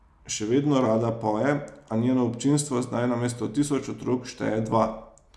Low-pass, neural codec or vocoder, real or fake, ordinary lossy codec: none; none; real; none